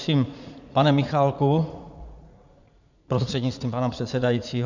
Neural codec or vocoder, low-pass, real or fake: vocoder, 44.1 kHz, 80 mel bands, Vocos; 7.2 kHz; fake